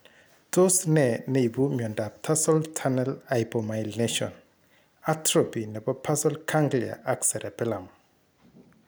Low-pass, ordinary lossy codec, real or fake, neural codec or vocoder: none; none; real; none